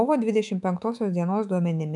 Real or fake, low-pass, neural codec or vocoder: fake; 10.8 kHz; autoencoder, 48 kHz, 128 numbers a frame, DAC-VAE, trained on Japanese speech